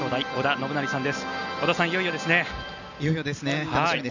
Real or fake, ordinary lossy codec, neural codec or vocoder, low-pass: real; none; none; 7.2 kHz